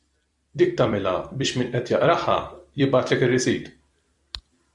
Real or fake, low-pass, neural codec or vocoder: fake; 10.8 kHz; vocoder, 44.1 kHz, 128 mel bands every 256 samples, BigVGAN v2